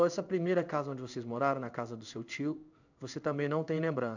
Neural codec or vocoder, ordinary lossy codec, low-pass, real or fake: codec, 16 kHz in and 24 kHz out, 1 kbps, XY-Tokenizer; none; 7.2 kHz; fake